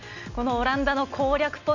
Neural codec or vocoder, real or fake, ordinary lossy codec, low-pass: none; real; none; 7.2 kHz